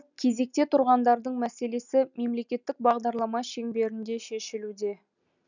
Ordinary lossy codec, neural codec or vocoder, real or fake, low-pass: none; none; real; 7.2 kHz